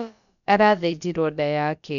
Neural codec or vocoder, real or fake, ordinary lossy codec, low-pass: codec, 16 kHz, about 1 kbps, DyCAST, with the encoder's durations; fake; none; 7.2 kHz